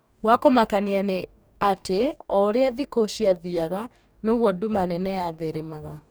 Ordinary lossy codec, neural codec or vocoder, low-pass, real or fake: none; codec, 44.1 kHz, 2.6 kbps, DAC; none; fake